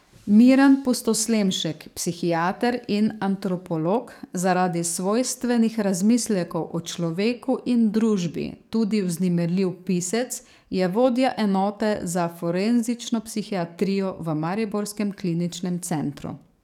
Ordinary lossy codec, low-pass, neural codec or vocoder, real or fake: none; 19.8 kHz; codec, 44.1 kHz, 7.8 kbps, DAC; fake